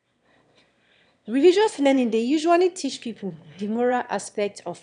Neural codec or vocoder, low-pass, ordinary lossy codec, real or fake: autoencoder, 22.05 kHz, a latent of 192 numbers a frame, VITS, trained on one speaker; 9.9 kHz; none; fake